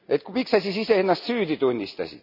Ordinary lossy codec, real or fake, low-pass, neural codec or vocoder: none; real; 5.4 kHz; none